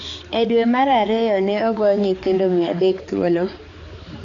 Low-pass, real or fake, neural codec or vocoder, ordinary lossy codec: 7.2 kHz; fake; codec, 16 kHz, 4 kbps, X-Codec, HuBERT features, trained on balanced general audio; AAC, 32 kbps